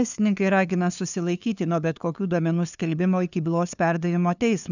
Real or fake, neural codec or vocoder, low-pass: fake; codec, 16 kHz, 2 kbps, FunCodec, trained on LibriTTS, 25 frames a second; 7.2 kHz